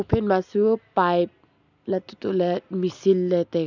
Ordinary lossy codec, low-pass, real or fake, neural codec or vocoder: none; 7.2 kHz; real; none